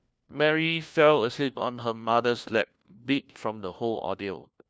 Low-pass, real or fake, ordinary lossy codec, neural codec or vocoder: none; fake; none; codec, 16 kHz, 1 kbps, FunCodec, trained on LibriTTS, 50 frames a second